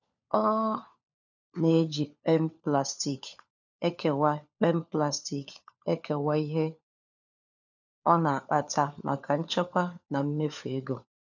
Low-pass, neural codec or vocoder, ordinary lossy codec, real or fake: 7.2 kHz; codec, 16 kHz, 4 kbps, FunCodec, trained on LibriTTS, 50 frames a second; none; fake